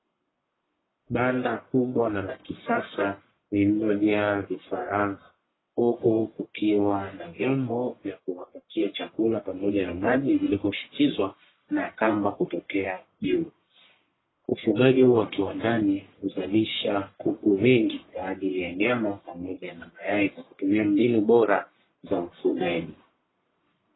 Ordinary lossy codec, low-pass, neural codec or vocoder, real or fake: AAC, 16 kbps; 7.2 kHz; codec, 44.1 kHz, 1.7 kbps, Pupu-Codec; fake